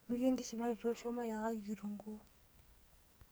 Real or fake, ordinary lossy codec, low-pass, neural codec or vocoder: fake; none; none; codec, 44.1 kHz, 2.6 kbps, SNAC